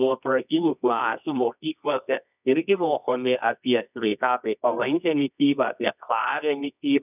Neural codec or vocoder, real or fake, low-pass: codec, 24 kHz, 0.9 kbps, WavTokenizer, medium music audio release; fake; 3.6 kHz